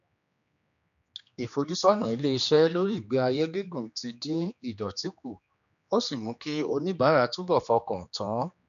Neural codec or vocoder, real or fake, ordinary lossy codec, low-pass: codec, 16 kHz, 2 kbps, X-Codec, HuBERT features, trained on general audio; fake; none; 7.2 kHz